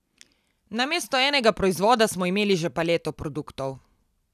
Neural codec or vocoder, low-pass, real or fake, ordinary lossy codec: none; 14.4 kHz; real; none